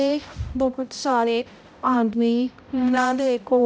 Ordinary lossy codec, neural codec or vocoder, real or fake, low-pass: none; codec, 16 kHz, 0.5 kbps, X-Codec, HuBERT features, trained on balanced general audio; fake; none